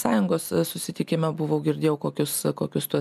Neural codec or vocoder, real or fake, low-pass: none; real; 14.4 kHz